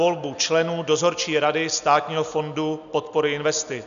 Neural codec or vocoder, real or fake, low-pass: none; real; 7.2 kHz